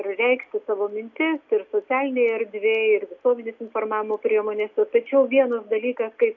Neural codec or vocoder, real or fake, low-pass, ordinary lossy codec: none; real; 7.2 kHz; AAC, 48 kbps